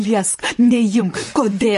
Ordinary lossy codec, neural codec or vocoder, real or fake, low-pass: MP3, 48 kbps; vocoder, 44.1 kHz, 128 mel bands, Pupu-Vocoder; fake; 14.4 kHz